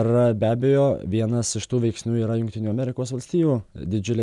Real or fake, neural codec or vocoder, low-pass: real; none; 10.8 kHz